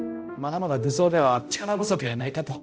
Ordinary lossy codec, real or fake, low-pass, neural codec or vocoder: none; fake; none; codec, 16 kHz, 0.5 kbps, X-Codec, HuBERT features, trained on balanced general audio